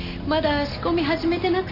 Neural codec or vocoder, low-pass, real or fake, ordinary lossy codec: none; 5.4 kHz; real; none